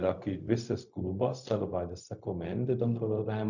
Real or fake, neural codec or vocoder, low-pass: fake; codec, 16 kHz, 0.4 kbps, LongCat-Audio-Codec; 7.2 kHz